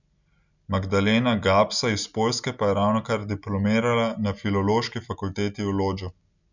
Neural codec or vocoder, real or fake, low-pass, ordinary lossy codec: none; real; 7.2 kHz; none